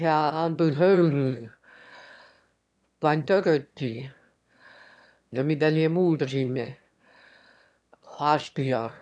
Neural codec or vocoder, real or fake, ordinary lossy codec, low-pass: autoencoder, 22.05 kHz, a latent of 192 numbers a frame, VITS, trained on one speaker; fake; none; none